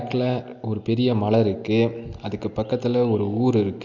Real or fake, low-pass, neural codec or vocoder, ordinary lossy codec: real; 7.2 kHz; none; none